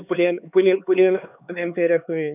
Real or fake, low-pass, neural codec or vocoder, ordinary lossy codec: fake; 3.6 kHz; codec, 16 kHz, 4 kbps, X-Codec, HuBERT features, trained on LibriSpeech; none